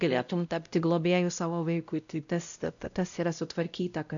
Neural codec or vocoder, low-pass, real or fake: codec, 16 kHz, 0.5 kbps, X-Codec, WavLM features, trained on Multilingual LibriSpeech; 7.2 kHz; fake